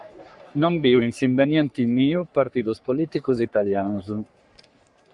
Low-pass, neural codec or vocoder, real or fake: 10.8 kHz; codec, 44.1 kHz, 3.4 kbps, Pupu-Codec; fake